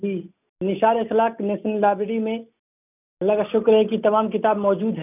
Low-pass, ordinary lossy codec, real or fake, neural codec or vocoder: 3.6 kHz; none; real; none